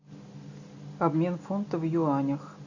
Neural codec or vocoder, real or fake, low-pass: none; real; 7.2 kHz